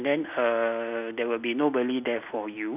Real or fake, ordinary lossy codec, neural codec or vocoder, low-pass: real; none; none; 3.6 kHz